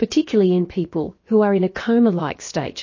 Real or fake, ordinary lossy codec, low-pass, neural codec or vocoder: fake; MP3, 32 kbps; 7.2 kHz; codec, 16 kHz, about 1 kbps, DyCAST, with the encoder's durations